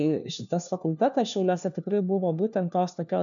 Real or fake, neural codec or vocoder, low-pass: fake; codec, 16 kHz, 1 kbps, FunCodec, trained on LibriTTS, 50 frames a second; 7.2 kHz